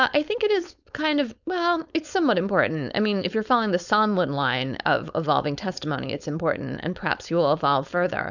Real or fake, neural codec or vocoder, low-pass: fake; codec, 16 kHz, 4.8 kbps, FACodec; 7.2 kHz